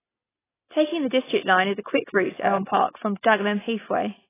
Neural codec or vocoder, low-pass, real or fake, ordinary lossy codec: none; 3.6 kHz; real; AAC, 16 kbps